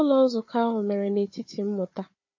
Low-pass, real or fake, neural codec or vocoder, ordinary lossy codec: 7.2 kHz; fake; codec, 16 kHz, 4 kbps, FunCodec, trained on Chinese and English, 50 frames a second; MP3, 32 kbps